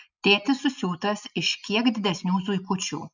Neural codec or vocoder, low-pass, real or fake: none; 7.2 kHz; real